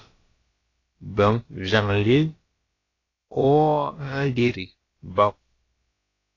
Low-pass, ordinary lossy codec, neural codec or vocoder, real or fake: 7.2 kHz; AAC, 32 kbps; codec, 16 kHz, about 1 kbps, DyCAST, with the encoder's durations; fake